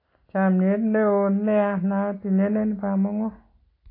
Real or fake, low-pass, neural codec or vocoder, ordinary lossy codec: real; 5.4 kHz; none; AAC, 24 kbps